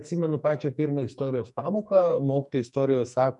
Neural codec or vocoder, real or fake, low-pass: codec, 44.1 kHz, 2.6 kbps, SNAC; fake; 10.8 kHz